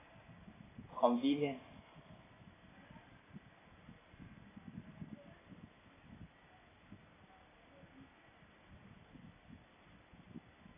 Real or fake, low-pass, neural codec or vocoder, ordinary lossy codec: fake; 3.6 kHz; vocoder, 44.1 kHz, 128 mel bands every 256 samples, BigVGAN v2; AAC, 16 kbps